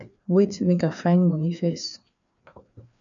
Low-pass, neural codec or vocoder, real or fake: 7.2 kHz; codec, 16 kHz, 2 kbps, FreqCodec, larger model; fake